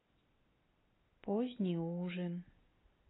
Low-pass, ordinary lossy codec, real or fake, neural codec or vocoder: 7.2 kHz; AAC, 16 kbps; real; none